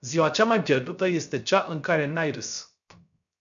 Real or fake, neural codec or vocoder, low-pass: fake; codec, 16 kHz, 0.3 kbps, FocalCodec; 7.2 kHz